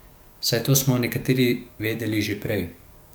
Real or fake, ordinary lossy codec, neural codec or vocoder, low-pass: fake; none; codec, 44.1 kHz, 7.8 kbps, DAC; none